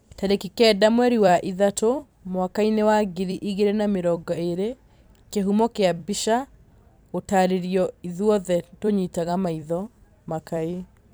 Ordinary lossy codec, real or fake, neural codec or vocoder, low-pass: none; fake; vocoder, 44.1 kHz, 128 mel bands every 256 samples, BigVGAN v2; none